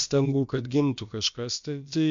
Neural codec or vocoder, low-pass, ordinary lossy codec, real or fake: codec, 16 kHz, about 1 kbps, DyCAST, with the encoder's durations; 7.2 kHz; MP3, 48 kbps; fake